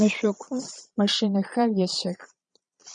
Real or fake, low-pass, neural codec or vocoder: fake; 9.9 kHz; vocoder, 22.05 kHz, 80 mel bands, Vocos